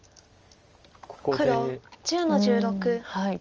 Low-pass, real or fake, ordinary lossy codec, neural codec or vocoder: 7.2 kHz; real; Opus, 24 kbps; none